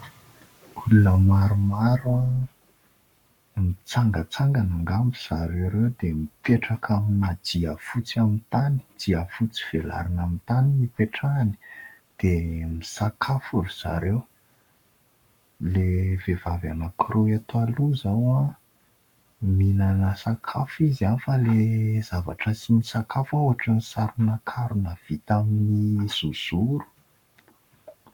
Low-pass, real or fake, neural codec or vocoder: 19.8 kHz; fake; codec, 44.1 kHz, 7.8 kbps, Pupu-Codec